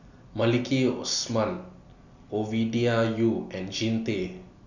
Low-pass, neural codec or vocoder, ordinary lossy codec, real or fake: 7.2 kHz; none; none; real